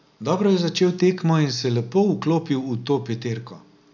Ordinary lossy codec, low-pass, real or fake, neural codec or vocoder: none; 7.2 kHz; real; none